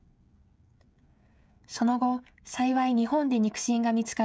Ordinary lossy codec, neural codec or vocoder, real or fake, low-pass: none; codec, 16 kHz, 16 kbps, FreqCodec, smaller model; fake; none